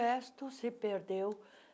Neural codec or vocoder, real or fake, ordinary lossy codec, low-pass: none; real; none; none